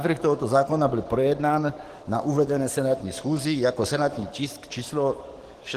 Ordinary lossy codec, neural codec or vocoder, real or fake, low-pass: Opus, 16 kbps; codec, 44.1 kHz, 7.8 kbps, DAC; fake; 14.4 kHz